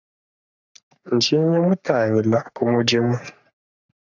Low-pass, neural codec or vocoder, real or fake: 7.2 kHz; codec, 44.1 kHz, 3.4 kbps, Pupu-Codec; fake